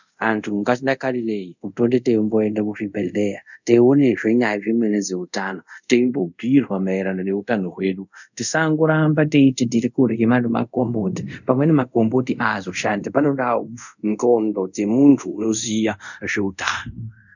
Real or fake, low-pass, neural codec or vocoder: fake; 7.2 kHz; codec, 24 kHz, 0.5 kbps, DualCodec